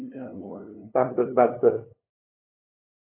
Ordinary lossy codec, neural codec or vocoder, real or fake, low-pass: AAC, 16 kbps; codec, 16 kHz, 2 kbps, FunCodec, trained on LibriTTS, 25 frames a second; fake; 3.6 kHz